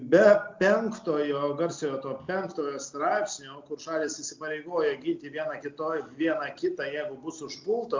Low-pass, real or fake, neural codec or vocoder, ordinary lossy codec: 7.2 kHz; real; none; MP3, 64 kbps